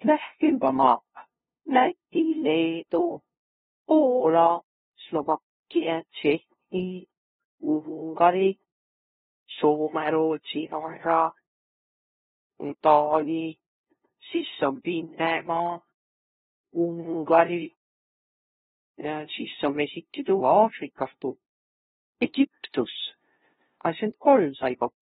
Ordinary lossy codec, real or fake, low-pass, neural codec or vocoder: AAC, 16 kbps; fake; 7.2 kHz; codec, 16 kHz, 0.5 kbps, FunCodec, trained on LibriTTS, 25 frames a second